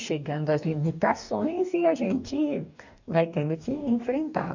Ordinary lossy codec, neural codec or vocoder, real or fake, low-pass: none; codec, 44.1 kHz, 2.6 kbps, DAC; fake; 7.2 kHz